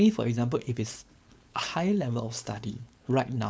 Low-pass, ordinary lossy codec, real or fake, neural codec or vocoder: none; none; fake; codec, 16 kHz, 4.8 kbps, FACodec